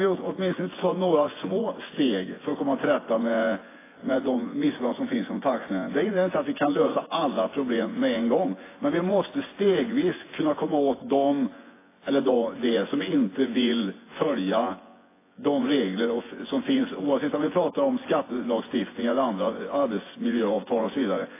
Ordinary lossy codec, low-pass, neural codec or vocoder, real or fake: AAC, 16 kbps; 3.6 kHz; vocoder, 24 kHz, 100 mel bands, Vocos; fake